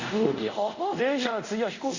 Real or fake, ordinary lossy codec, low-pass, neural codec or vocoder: fake; Opus, 64 kbps; 7.2 kHz; codec, 24 kHz, 0.5 kbps, DualCodec